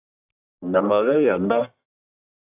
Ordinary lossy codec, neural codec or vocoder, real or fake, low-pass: AAC, 32 kbps; codec, 44.1 kHz, 1.7 kbps, Pupu-Codec; fake; 3.6 kHz